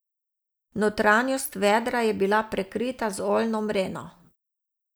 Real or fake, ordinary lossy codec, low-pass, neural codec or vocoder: real; none; none; none